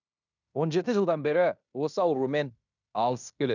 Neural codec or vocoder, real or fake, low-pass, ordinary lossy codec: codec, 16 kHz in and 24 kHz out, 0.9 kbps, LongCat-Audio-Codec, fine tuned four codebook decoder; fake; 7.2 kHz; none